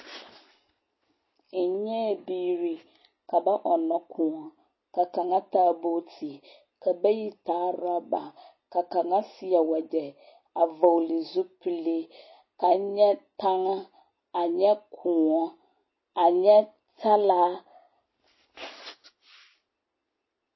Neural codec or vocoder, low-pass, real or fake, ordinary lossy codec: none; 7.2 kHz; real; MP3, 24 kbps